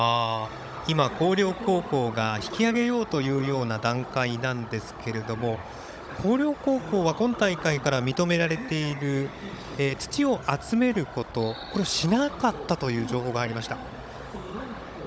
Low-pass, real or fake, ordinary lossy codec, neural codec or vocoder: none; fake; none; codec, 16 kHz, 16 kbps, FunCodec, trained on Chinese and English, 50 frames a second